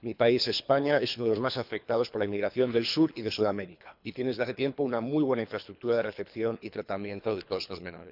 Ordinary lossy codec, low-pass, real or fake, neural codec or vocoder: none; 5.4 kHz; fake; codec, 24 kHz, 3 kbps, HILCodec